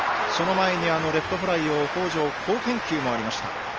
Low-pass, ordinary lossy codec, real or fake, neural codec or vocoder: 7.2 kHz; Opus, 24 kbps; real; none